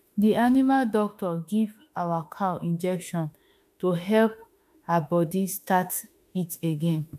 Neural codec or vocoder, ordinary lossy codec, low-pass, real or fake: autoencoder, 48 kHz, 32 numbers a frame, DAC-VAE, trained on Japanese speech; none; 14.4 kHz; fake